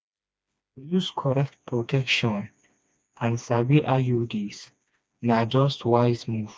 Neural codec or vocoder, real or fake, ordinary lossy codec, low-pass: codec, 16 kHz, 2 kbps, FreqCodec, smaller model; fake; none; none